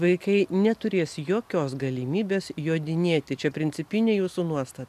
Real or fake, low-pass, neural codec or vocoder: real; 14.4 kHz; none